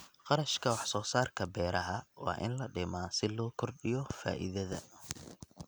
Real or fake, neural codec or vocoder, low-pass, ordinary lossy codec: real; none; none; none